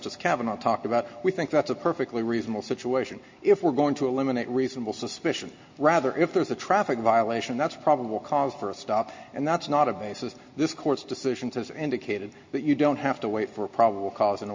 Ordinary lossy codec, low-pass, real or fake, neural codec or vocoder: MP3, 48 kbps; 7.2 kHz; real; none